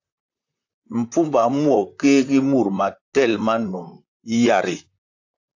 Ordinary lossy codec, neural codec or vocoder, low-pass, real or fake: AAC, 48 kbps; vocoder, 44.1 kHz, 128 mel bands, Pupu-Vocoder; 7.2 kHz; fake